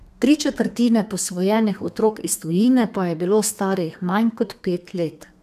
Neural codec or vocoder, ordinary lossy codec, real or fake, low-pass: codec, 32 kHz, 1.9 kbps, SNAC; none; fake; 14.4 kHz